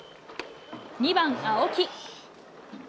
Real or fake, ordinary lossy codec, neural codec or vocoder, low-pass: real; none; none; none